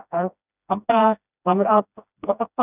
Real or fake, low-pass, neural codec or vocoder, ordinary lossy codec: fake; 3.6 kHz; codec, 16 kHz, 1 kbps, FreqCodec, smaller model; none